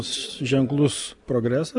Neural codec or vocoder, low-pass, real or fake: none; 10.8 kHz; real